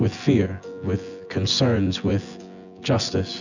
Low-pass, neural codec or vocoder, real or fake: 7.2 kHz; vocoder, 24 kHz, 100 mel bands, Vocos; fake